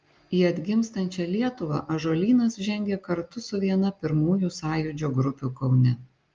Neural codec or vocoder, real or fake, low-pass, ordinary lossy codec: none; real; 7.2 kHz; Opus, 24 kbps